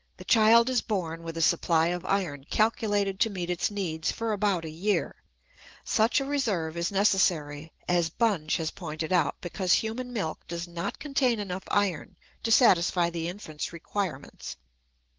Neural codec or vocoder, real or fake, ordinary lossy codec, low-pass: none; real; Opus, 16 kbps; 7.2 kHz